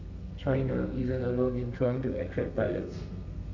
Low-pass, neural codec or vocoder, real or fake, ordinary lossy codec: 7.2 kHz; codec, 32 kHz, 1.9 kbps, SNAC; fake; none